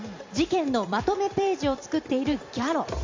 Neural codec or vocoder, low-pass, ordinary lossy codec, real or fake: vocoder, 44.1 kHz, 128 mel bands every 256 samples, BigVGAN v2; 7.2 kHz; MP3, 64 kbps; fake